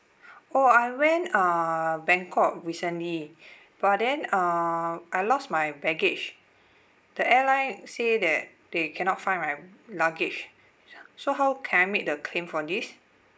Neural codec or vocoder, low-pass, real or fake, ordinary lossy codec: none; none; real; none